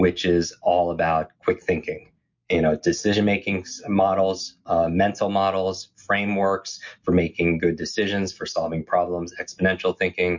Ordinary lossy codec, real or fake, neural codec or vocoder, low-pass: MP3, 48 kbps; real; none; 7.2 kHz